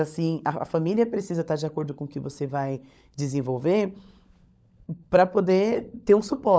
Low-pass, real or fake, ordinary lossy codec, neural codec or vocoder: none; fake; none; codec, 16 kHz, 16 kbps, FunCodec, trained on LibriTTS, 50 frames a second